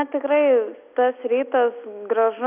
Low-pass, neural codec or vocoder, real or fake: 3.6 kHz; none; real